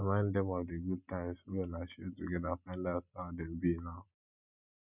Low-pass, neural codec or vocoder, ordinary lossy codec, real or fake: 3.6 kHz; none; none; real